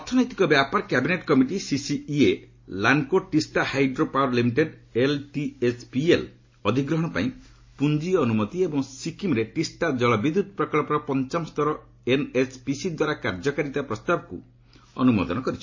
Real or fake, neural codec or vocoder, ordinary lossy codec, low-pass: real; none; MP3, 32 kbps; 7.2 kHz